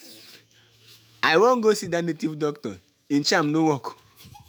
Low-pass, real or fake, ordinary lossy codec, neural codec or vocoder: none; fake; none; autoencoder, 48 kHz, 128 numbers a frame, DAC-VAE, trained on Japanese speech